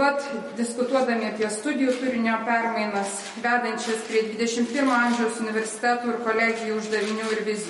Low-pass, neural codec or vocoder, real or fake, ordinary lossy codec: 19.8 kHz; none; real; MP3, 48 kbps